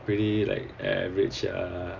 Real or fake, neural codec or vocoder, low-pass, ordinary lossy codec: real; none; 7.2 kHz; Opus, 64 kbps